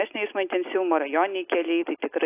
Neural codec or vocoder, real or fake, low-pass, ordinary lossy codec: none; real; 3.6 kHz; AAC, 24 kbps